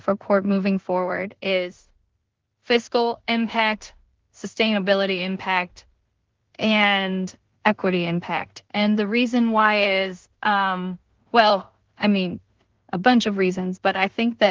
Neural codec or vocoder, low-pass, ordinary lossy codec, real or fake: codec, 16 kHz in and 24 kHz out, 0.4 kbps, LongCat-Audio-Codec, two codebook decoder; 7.2 kHz; Opus, 16 kbps; fake